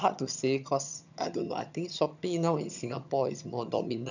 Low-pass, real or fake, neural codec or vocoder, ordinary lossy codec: 7.2 kHz; fake; vocoder, 22.05 kHz, 80 mel bands, HiFi-GAN; none